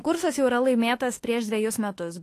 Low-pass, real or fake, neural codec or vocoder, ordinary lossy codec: 14.4 kHz; fake; autoencoder, 48 kHz, 32 numbers a frame, DAC-VAE, trained on Japanese speech; AAC, 48 kbps